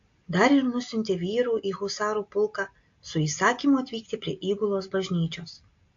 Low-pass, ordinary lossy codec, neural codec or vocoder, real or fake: 7.2 kHz; AAC, 48 kbps; none; real